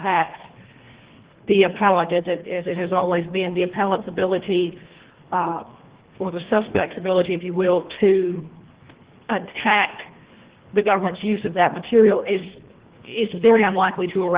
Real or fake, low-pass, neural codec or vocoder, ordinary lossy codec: fake; 3.6 kHz; codec, 24 kHz, 1.5 kbps, HILCodec; Opus, 16 kbps